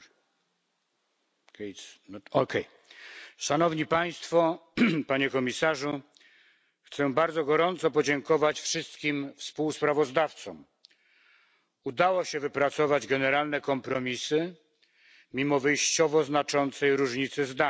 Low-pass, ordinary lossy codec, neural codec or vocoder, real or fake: none; none; none; real